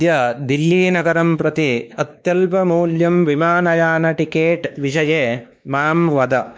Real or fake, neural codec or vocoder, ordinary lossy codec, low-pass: fake; codec, 16 kHz, 2 kbps, X-Codec, WavLM features, trained on Multilingual LibriSpeech; none; none